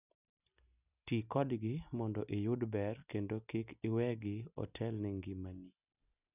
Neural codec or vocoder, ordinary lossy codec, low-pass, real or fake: none; none; 3.6 kHz; real